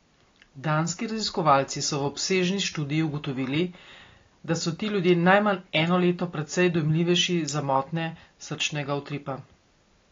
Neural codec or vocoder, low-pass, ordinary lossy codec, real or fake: none; 7.2 kHz; AAC, 32 kbps; real